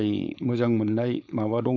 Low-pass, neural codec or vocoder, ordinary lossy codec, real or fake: 7.2 kHz; codec, 16 kHz, 4.8 kbps, FACodec; none; fake